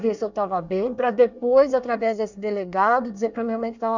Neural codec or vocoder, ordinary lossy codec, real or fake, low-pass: codec, 24 kHz, 1 kbps, SNAC; none; fake; 7.2 kHz